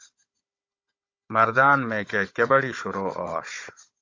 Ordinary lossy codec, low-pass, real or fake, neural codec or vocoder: AAC, 48 kbps; 7.2 kHz; fake; codec, 16 kHz, 16 kbps, FunCodec, trained on Chinese and English, 50 frames a second